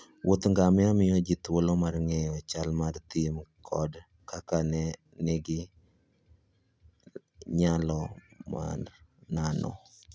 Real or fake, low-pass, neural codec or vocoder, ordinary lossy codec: real; none; none; none